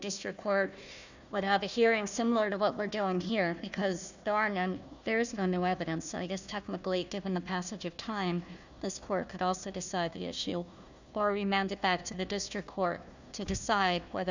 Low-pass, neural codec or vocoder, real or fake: 7.2 kHz; codec, 16 kHz, 1 kbps, FunCodec, trained on Chinese and English, 50 frames a second; fake